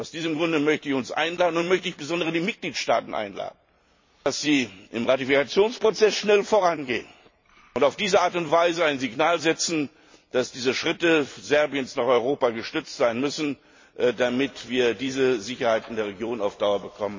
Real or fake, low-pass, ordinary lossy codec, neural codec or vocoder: fake; 7.2 kHz; MP3, 32 kbps; vocoder, 44.1 kHz, 128 mel bands every 256 samples, BigVGAN v2